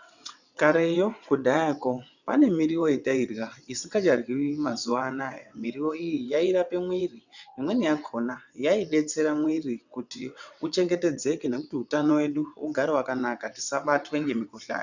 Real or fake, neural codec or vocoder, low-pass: fake; vocoder, 22.05 kHz, 80 mel bands, WaveNeXt; 7.2 kHz